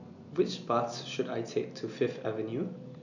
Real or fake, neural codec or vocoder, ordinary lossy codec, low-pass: real; none; none; 7.2 kHz